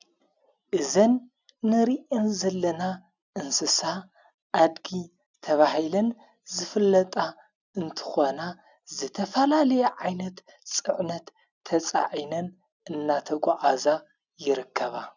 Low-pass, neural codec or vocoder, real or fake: 7.2 kHz; none; real